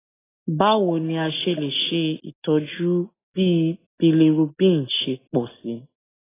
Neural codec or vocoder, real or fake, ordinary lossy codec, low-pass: none; real; AAC, 16 kbps; 3.6 kHz